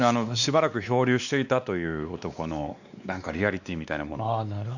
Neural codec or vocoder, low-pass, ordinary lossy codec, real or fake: codec, 16 kHz, 2 kbps, X-Codec, WavLM features, trained on Multilingual LibriSpeech; 7.2 kHz; none; fake